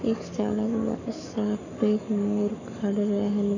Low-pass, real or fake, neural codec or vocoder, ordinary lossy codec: 7.2 kHz; fake; codec, 44.1 kHz, 7.8 kbps, Pupu-Codec; none